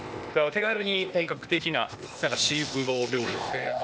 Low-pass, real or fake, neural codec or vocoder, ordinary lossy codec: none; fake; codec, 16 kHz, 0.8 kbps, ZipCodec; none